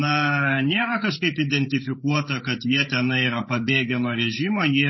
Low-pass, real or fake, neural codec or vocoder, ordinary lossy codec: 7.2 kHz; fake; codec, 16 kHz, 8 kbps, FreqCodec, larger model; MP3, 24 kbps